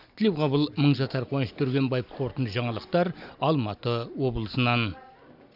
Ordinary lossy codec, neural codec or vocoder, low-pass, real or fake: none; none; 5.4 kHz; real